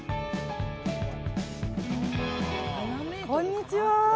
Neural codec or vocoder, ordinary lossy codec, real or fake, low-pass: none; none; real; none